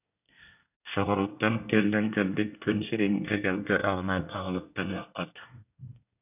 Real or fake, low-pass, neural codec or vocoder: fake; 3.6 kHz; codec, 24 kHz, 1 kbps, SNAC